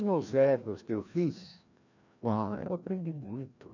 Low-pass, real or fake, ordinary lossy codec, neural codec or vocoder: 7.2 kHz; fake; none; codec, 16 kHz, 1 kbps, FreqCodec, larger model